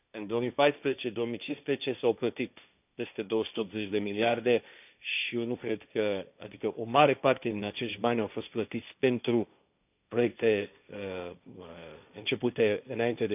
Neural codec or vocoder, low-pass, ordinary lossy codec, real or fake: codec, 16 kHz, 1.1 kbps, Voila-Tokenizer; 3.6 kHz; none; fake